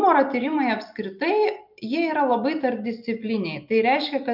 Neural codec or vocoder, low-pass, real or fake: none; 5.4 kHz; real